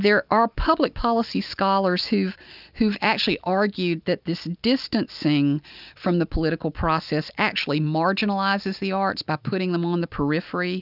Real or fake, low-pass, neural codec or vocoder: real; 5.4 kHz; none